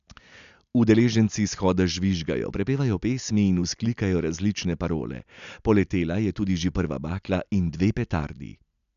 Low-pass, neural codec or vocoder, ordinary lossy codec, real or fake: 7.2 kHz; none; none; real